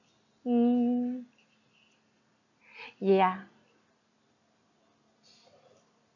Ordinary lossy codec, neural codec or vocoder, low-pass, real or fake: none; none; 7.2 kHz; real